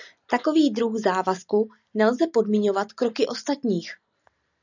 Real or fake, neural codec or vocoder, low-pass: real; none; 7.2 kHz